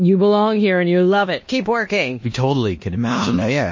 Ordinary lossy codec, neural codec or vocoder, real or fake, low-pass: MP3, 32 kbps; codec, 16 kHz in and 24 kHz out, 0.9 kbps, LongCat-Audio-Codec, four codebook decoder; fake; 7.2 kHz